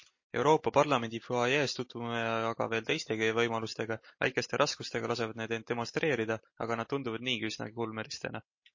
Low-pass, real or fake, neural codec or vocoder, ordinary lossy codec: 7.2 kHz; real; none; MP3, 32 kbps